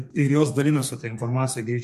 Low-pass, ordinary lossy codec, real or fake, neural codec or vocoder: 14.4 kHz; MP3, 64 kbps; fake; codec, 32 kHz, 1.9 kbps, SNAC